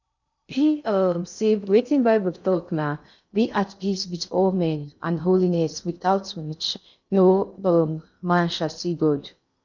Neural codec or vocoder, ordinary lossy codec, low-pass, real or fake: codec, 16 kHz in and 24 kHz out, 0.6 kbps, FocalCodec, streaming, 2048 codes; none; 7.2 kHz; fake